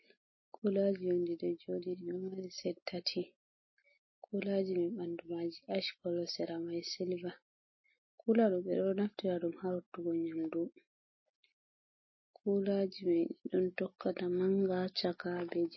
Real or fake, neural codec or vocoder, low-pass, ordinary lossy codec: real; none; 7.2 kHz; MP3, 24 kbps